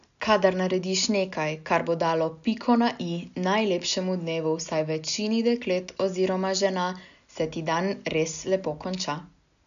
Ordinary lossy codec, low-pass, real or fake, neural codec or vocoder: none; 7.2 kHz; real; none